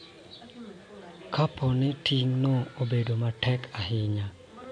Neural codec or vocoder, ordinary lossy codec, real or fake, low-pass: none; MP3, 64 kbps; real; 9.9 kHz